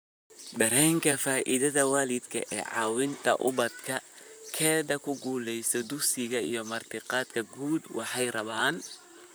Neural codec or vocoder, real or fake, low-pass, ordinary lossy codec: vocoder, 44.1 kHz, 128 mel bands, Pupu-Vocoder; fake; none; none